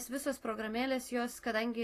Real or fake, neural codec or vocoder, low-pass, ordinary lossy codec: real; none; 14.4 kHz; AAC, 48 kbps